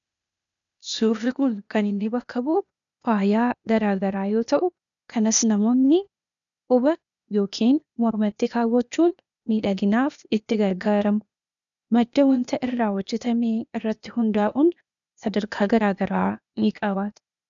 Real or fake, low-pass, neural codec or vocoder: fake; 7.2 kHz; codec, 16 kHz, 0.8 kbps, ZipCodec